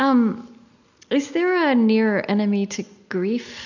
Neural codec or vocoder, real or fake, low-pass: none; real; 7.2 kHz